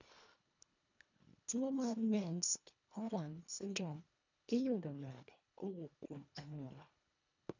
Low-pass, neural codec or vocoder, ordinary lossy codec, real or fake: 7.2 kHz; codec, 24 kHz, 1.5 kbps, HILCodec; none; fake